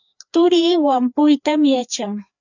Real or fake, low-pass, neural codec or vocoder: fake; 7.2 kHz; codec, 16 kHz, 2 kbps, FreqCodec, larger model